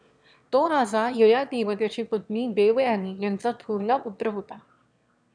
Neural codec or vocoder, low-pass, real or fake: autoencoder, 22.05 kHz, a latent of 192 numbers a frame, VITS, trained on one speaker; 9.9 kHz; fake